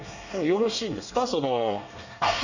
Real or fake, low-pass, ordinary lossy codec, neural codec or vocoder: fake; 7.2 kHz; none; codec, 24 kHz, 1 kbps, SNAC